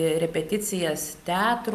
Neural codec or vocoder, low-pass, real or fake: none; 14.4 kHz; real